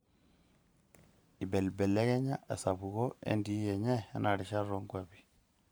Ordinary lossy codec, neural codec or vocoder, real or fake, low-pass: none; vocoder, 44.1 kHz, 128 mel bands every 512 samples, BigVGAN v2; fake; none